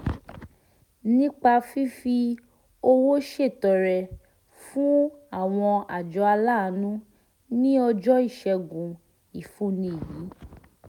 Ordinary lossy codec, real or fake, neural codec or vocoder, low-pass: none; real; none; 19.8 kHz